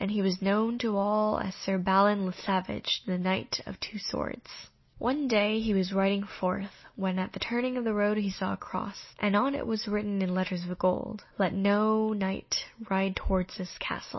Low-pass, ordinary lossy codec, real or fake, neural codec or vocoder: 7.2 kHz; MP3, 24 kbps; real; none